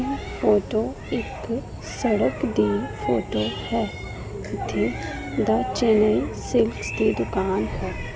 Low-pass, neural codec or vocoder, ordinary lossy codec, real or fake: none; none; none; real